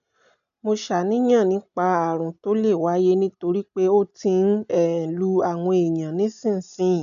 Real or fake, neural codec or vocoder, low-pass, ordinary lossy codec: real; none; 7.2 kHz; none